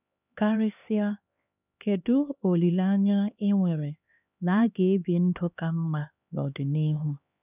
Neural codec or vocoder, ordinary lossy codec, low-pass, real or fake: codec, 16 kHz, 2 kbps, X-Codec, HuBERT features, trained on LibriSpeech; none; 3.6 kHz; fake